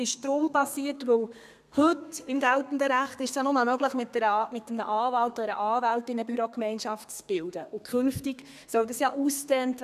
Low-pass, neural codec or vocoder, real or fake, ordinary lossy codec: 14.4 kHz; codec, 32 kHz, 1.9 kbps, SNAC; fake; none